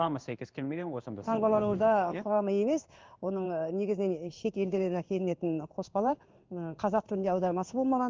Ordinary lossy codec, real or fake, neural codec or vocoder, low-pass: Opus, 32 kbps; fake; codec, 16 kHz in and 24 kHz out, 1 kbps, XY-Tokenizer; 7.2 kHz